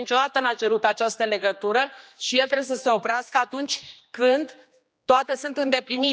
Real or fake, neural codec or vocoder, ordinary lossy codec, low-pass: fake; codec, 16 kHz, 2 kbps, X-Codec, HuBERT features, trained on general audio; none; none